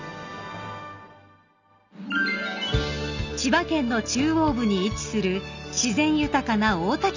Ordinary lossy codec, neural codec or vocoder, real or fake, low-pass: none; none; real; 7.2 kHz